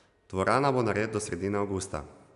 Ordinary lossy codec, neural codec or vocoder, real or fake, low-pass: none; none; real; 10.8 kHz